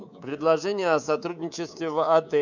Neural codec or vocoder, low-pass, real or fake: codec, 24 kHz, 3.1 kbps, DualCodec; 7.2 kHz; fake